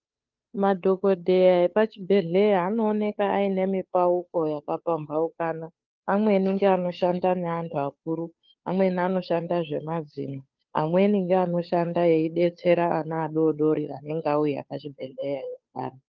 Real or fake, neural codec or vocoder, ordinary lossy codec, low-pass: fake; codec, 16 kHz, 2 kbps, FunCodec, trained on Chinese and English, 25 frames a second; Opus, 24 kbps; 7.2 kHz